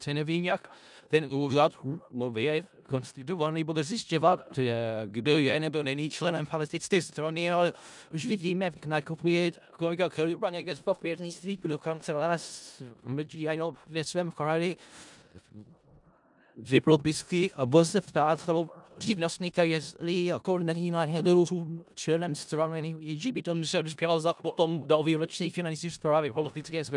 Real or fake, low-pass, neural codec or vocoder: fake; 10.8 kHz; codec, 16 kHz in and 24 kHz out, 0.4 kbps, LongCat-Audio-Codec, four codebook decoder